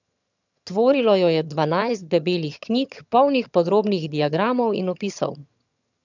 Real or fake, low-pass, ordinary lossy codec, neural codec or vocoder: fake; 7.2 kHz; none; vocoder, 22.05 kHz, 80 mel bands, HiFi-GAN